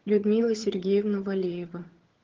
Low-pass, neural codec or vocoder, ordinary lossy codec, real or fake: 7.2 kHz; vocoder, 22.05 kHz, 80 mel bands, HiFi-GAN; Opus, 16 kbps; fake